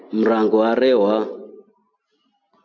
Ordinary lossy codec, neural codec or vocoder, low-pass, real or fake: MP3, 48 kbps; none; 7.2 kHz; real